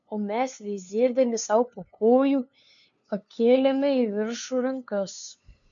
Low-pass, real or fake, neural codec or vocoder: 7.2 kHz; fake; codec, 16 kHz, 2 kbps, FunCodec, trained on LibriTTS, 25 frames a second